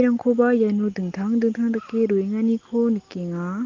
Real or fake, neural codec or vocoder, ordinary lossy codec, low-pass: real; none; Opus, 16 kbps; 7.2 kHz